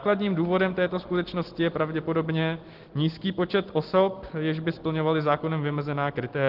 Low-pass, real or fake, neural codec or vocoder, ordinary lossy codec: 5.4 kHz; real; none; Opus, 16 kbps